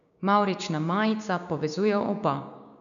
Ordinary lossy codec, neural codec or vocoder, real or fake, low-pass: none; codec, 16 kHz, 6 kbps, DAC; fake; 7.2 kHz